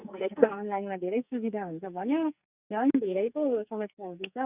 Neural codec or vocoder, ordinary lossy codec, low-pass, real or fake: codec, 44.1 kHz, 2.6 kbps, SNAC; Opus, 64 kbps; 3.6 kHz; fake